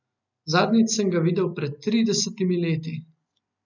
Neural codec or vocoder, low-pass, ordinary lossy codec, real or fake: none; 7.2 kHz; none; real